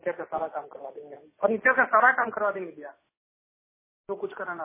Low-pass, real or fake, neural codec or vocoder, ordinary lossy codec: 3.6 kHz; real; none; MP3, 16 kbps